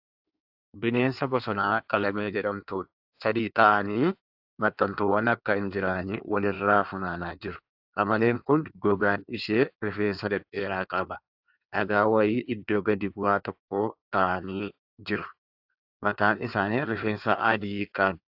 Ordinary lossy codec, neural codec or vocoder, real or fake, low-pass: AAC, 48 kbps; codec, 16 kHz in and 24 kHz out, 1.1 kbps, FireRedTTS-2 codec; fake; 5.4 kHz